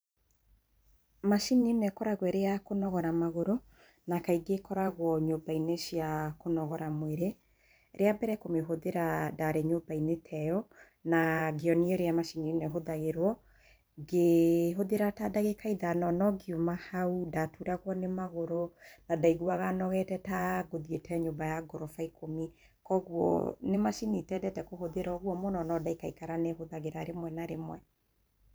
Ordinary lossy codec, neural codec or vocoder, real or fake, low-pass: none; vocoder, 44.1 kHz, 128 mel bands every 512 samples, BigVGAN v2; fake; none